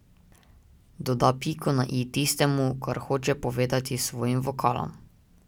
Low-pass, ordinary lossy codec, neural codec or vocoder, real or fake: 19.8 kHz; none; none; real